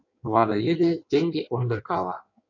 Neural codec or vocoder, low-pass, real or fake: codec, 16 kHz in and 24 kHz out, 1.1 kbps, FireRedTTS-2 codec; 7.2 kHz; fake